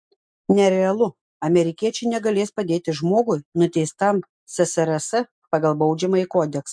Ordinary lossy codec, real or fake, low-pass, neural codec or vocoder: MP3, 64 kbps; real; 9.9 kHz; none